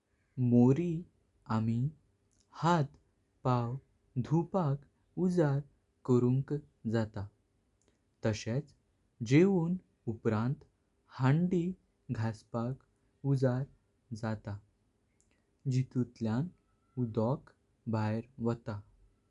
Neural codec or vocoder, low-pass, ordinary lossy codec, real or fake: none; 10.8 kHz; none; real